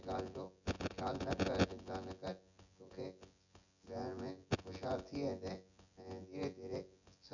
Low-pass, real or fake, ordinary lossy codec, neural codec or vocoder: 7.2 kHz; fake; none; vocoder, 24 kHz, 100 mel bands, Vocos